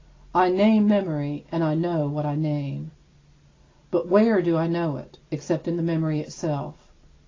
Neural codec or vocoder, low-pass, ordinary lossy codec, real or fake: none; 7.2 kHz; AAC, 32 kbps; real